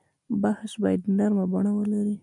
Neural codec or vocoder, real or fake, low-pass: none; real; 10.8 kHz